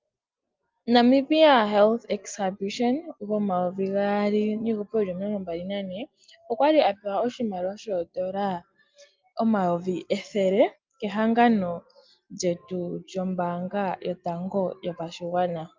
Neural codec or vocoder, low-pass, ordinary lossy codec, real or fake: none; 7.2 kHz; Opus, 24 kbps; real